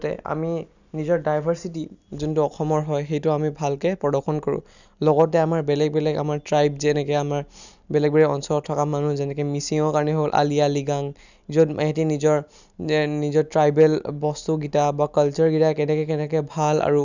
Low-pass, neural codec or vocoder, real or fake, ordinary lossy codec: 7.2 kHz; none; real; none